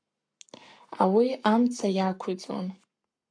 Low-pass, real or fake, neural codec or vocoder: 9.9 kHz; fake; codec, 44.1 kHz, 7.8 kbps, Pupu-Codec